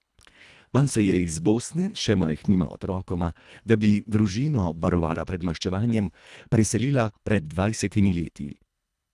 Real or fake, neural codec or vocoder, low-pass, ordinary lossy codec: fake; codec, 24 kHz, 1.5 kbps, HILCodec; none; none